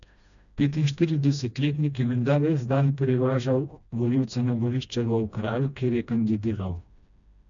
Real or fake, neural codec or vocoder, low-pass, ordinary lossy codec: fake; codec, 16 kHz, 1 kbps, FreqCodec, smaller model; 7.2 kHz; none